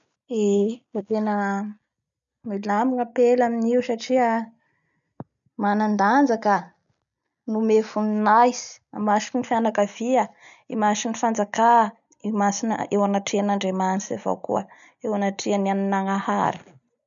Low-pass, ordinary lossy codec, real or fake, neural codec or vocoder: 7.2 kHz; none; real; none